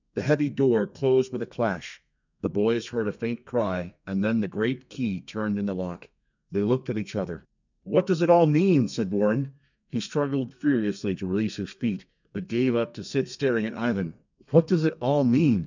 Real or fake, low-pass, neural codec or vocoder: fake; 7.2 kHz; codec, 32 kHz, 1.9 kbps, SNAC